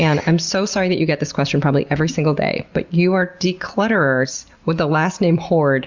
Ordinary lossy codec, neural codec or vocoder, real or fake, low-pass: Opus, 64 kbps; none; real; 7.2 kHz